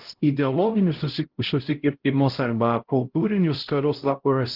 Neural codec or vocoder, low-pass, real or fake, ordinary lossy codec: codec, 16 kHz, 0.5 kbps, X-Codec, HuBERT features, trained on LibriSpeech; 5.4 kHz; fake; Opus, 16 kbps